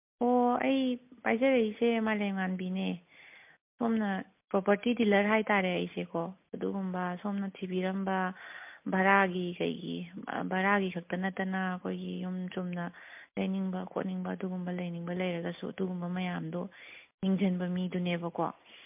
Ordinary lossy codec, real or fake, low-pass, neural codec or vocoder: MP3, 32 kbps; real; 3.6 kHz; none